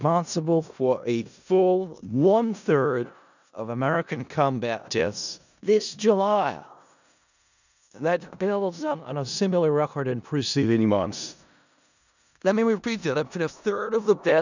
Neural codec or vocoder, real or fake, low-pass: codec, 16 kHz in and 24 kHz out, 0.4 kbps, LongCat-Audio-Codec, four codebook decoder; fake; 7.2 kHz